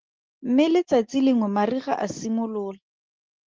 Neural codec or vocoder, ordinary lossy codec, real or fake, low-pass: none; Opus, 16 kbps; real; 7.2 kHz